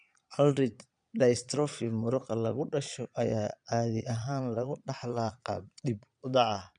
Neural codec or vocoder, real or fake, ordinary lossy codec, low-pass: vocoder, 22.05 kHz, 80 mel bands, Vocos; fake; none; 9.9 kHz